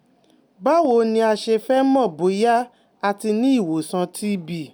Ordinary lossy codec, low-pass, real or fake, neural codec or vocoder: none; none; real; none